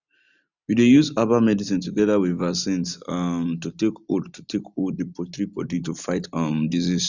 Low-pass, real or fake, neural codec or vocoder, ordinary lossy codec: 7.2 kHz; real; none; none